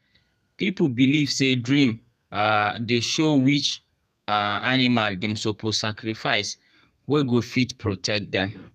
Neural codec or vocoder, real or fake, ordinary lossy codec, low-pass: codec, 32 kHz, 1.9 kbps, SNAC; fake; none; 14.4 kHz